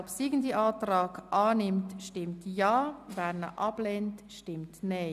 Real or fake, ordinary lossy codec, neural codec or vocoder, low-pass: real; none; none; 14.4 kHz